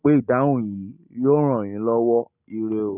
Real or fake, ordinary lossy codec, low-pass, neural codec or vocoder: real; none; 3.6 kHz; none